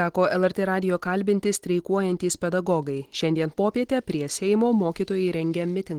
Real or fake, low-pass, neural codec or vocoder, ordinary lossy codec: real; 19.8 kHz; none; Opus, 16 kbps